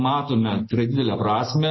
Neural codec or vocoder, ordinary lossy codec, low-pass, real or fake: none; MP3, 24 kbps; 7.2 kHz; real